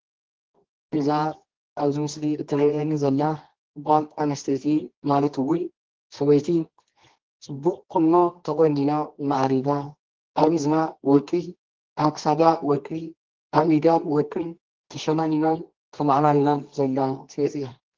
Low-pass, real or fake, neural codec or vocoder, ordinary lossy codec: 7.2 kHz; fake; codec, 24 kHz, 0.9 kbps, WavTokenizer, medium music audio release; Opus, 16 kbps